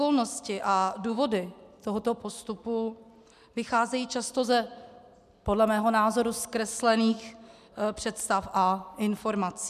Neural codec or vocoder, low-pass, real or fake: none; 14.4 kHz; real